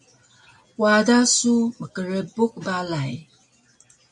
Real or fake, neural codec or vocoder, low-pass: real; none; 10.8 kHz